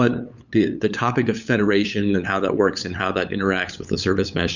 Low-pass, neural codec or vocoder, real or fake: 7.2 kHz; codec, 16 kHz, 8 kbps, FunCodec, trained on LibriTTS, 25 frames a second; fake